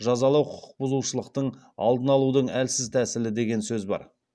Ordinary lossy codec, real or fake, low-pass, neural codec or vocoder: none; real; none; none